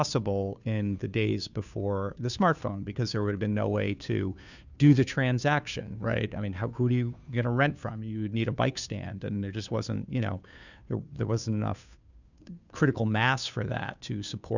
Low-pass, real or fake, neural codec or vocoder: 7.2 kHz; fake; codec, 16 kHz, 2 kbps, FunCodec, trained on Chinese and English, 25 frames a second